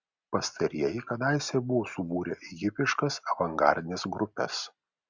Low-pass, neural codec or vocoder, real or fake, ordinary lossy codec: 7.2 kHz; none; real; Opus, 64 kbps